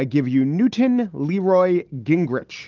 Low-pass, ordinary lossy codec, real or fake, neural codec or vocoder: 7.2 kHz; Opus, 24 kbps; real; none